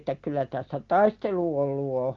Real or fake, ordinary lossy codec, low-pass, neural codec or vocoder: real; Opus, 32 kbps; 7.2 kHz; none